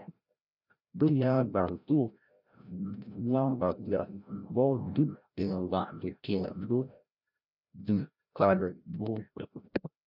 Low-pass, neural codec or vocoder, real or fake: 5.4 kHz; codec, 16 kHz, 0.5 kbps, FreqCodec, larger model; fake